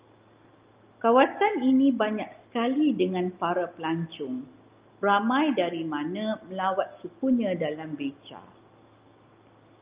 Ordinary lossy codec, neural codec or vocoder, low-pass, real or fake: Opus, 24 kbps; none; 3.6 kHz; real